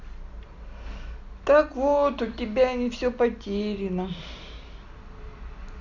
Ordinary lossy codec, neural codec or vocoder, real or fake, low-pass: none; none; real; 7.2 kHz